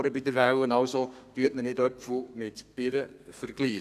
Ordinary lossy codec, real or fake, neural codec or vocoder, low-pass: none; fake; codec, 32 kHz, 1.9 kbps, SNAC; 14.4 kHz